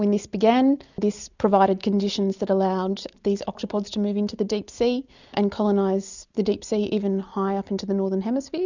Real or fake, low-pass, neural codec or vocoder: real; 7.2 kHz; none